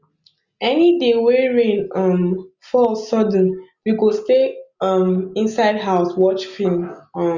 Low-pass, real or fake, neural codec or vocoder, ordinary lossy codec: 7.2 kHz; real; none; Opus, 64 kbps